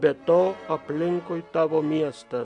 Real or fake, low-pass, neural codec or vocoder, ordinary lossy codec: real; 10.8 kHz; none; MP3, 64 kbps